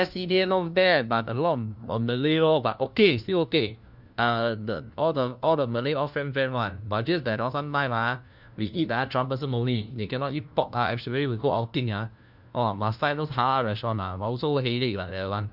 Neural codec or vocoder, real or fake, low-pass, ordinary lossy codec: codec, 16 kHz, 1 kbps, FunCodec, trained on LibriTTS, 50 frames a second; fake; 5.4 kHz; none